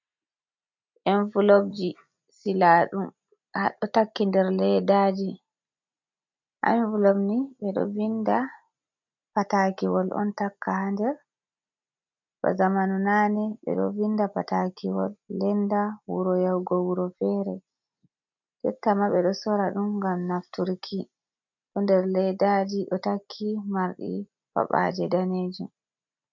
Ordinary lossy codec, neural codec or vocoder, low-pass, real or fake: MP3, 64 kbps; none; 7.2 kHz; real